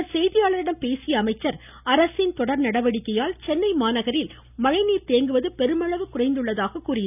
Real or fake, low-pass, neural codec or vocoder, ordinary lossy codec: real; 3.6 kHz; none; none